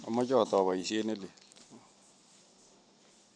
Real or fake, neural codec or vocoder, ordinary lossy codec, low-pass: real; none; none; 9.9 kHz